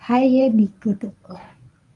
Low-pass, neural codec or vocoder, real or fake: 10.8 kHz; codec, 24 kHz, 0.9 kbps, WavTokenizer, medium speech release version 1; fake